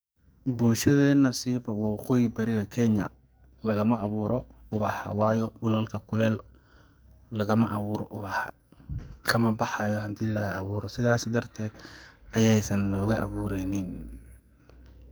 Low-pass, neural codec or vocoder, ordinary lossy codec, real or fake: none; codec, 44.1 kHz, 2.6 kbps, SNAC; none; fake